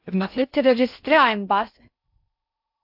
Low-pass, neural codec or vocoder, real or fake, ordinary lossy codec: 5.4 kHz; codec, 16 kHz in and 24 kHz out, 0.6 kbps, FocalCodec, streaming, 2048 codes; fake; AAC, 48 kbps